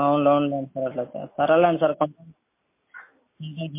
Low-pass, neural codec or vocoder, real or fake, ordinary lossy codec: 3.6 kHz; none; real; MP3, 24 kbps